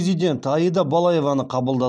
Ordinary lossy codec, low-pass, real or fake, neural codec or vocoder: none; none; real; none